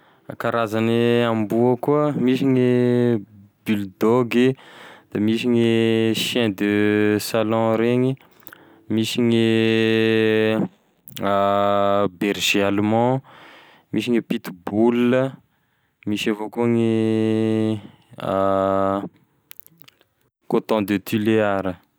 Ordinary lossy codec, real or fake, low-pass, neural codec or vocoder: none; real; none; none